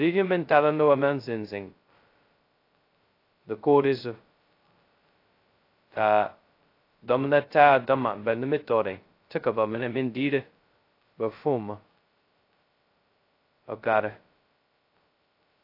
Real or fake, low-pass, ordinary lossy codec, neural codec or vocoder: fake; 5.4 kHz; AAC, 32 kbps; codec, 16 kHz, 0.2 kbps, FocalCodec